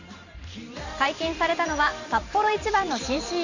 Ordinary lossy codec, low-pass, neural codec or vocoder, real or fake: none; 7.2 kHz; vocoder, 44.1 kHz, 128 mel bands every 512 samples, BigVGAN v2; fake